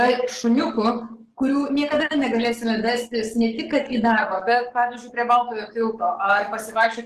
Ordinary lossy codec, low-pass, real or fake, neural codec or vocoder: Opus, 16 kbps; 14.4 kHz; fake; codec, 44.1 kHz, 7.8 kbps, DAC